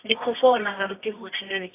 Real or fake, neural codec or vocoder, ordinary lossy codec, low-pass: fake; codec, 24 kHz, 0.9 kbps, WavTokenizer, medium music audio release; AAC, 24 kbps; 3.6 kHz